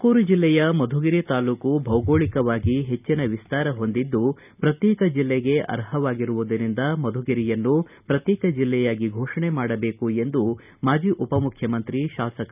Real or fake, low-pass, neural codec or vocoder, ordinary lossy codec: real; 3.6 kHz; none; none